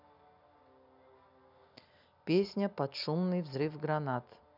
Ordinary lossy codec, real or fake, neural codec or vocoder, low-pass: none; real; none; 5.4 kHz